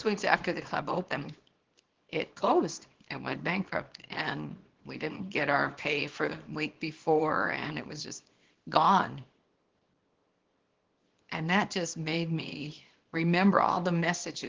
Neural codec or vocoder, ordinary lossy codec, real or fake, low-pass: codec, 24 kHz, 0.9 kbps, WavTokenizer, small release; Opus, 16 kbps; fake; 7.2 kHz